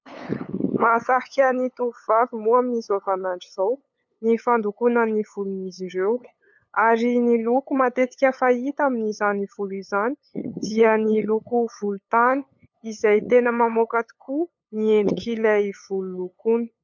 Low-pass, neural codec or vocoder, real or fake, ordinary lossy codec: 7.2 kHz; codec, 16 kHz, 8 kbps, FunCodec, trained on LibriTTS, 25 frames a second; fake; MP3, 64 kbps